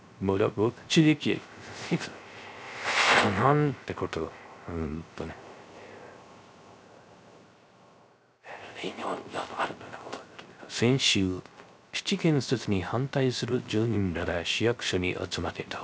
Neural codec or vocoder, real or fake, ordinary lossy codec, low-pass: codec, 16 kHz, 0.3 kbps, FocalCodec; fake; none; none